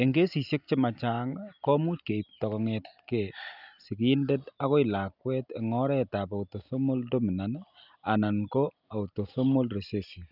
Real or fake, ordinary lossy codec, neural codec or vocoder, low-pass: real; none; none; 5.4 kHz